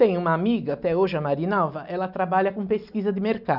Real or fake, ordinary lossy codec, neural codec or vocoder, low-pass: real; none; none; 5.4 kHz